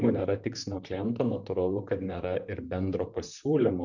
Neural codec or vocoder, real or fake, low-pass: vocoder, 44.1 kHz, 128 mel bands, Pupu-Vocoder; fake; 7.2 kHz